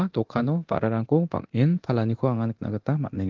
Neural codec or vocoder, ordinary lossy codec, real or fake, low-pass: codec, 24 kHz, 0.9 kbps, DualCodec; Opus, 16 kbps; fake; 7.2 kHz